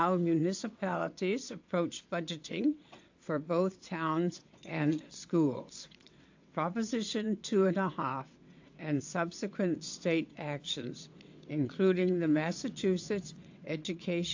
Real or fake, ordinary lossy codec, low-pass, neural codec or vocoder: fake; AAC, 48 kbps; 7.2 kHz; vocoder, 22.05 kHz, 80 mel bands, WaveNeXt